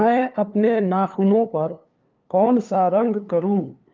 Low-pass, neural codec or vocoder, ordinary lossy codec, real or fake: 7.2 kHz; codec, 16 kHz, 2 kbps, FunCodec, trained on LibriTTS, 25 frames a second; Opus, 24 kbps; fake